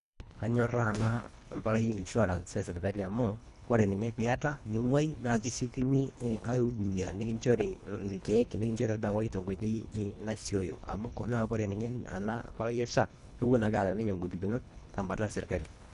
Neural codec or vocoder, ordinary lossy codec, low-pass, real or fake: codec, 24 kHz, 1.5 kbps, HILCodec; none; 10.8 kHz; fake